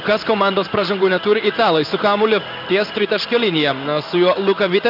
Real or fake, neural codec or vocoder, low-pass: fake; codec, 16 kHz in and 24 kHz out, 1 kbps, XY-Tokenizer; 5.4 kHz